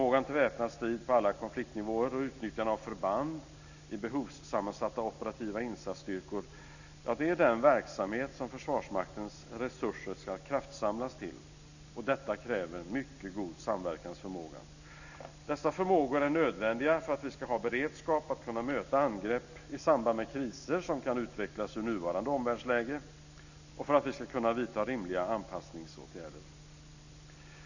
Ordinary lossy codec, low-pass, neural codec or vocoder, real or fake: none; 7.2 kHz; none; real